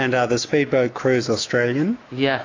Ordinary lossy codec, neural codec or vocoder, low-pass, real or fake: AAC, 32 kbps; autoencoder, 48 kHz, 128 numbers a frame, DAC-VAE, trained on Japanese speech; 7.2 kHz; fake